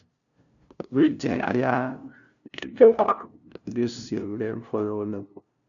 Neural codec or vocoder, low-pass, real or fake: codec, 16 kHz, 0.5 kbps, FunCodec, trained on LibriTTS, 25 frames a second; 7.2 kHz; fake